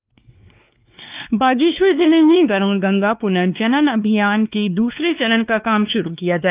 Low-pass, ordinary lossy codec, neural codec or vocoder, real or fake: 3.6 kHz; Opus, 24 kbps; codec, 16 kHz, 2 kbps, X-Codec, WavLM features, trained on Multilingual LibriSpeech; fake